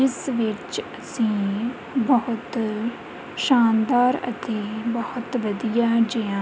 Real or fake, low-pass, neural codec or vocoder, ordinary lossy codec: real; none; none; none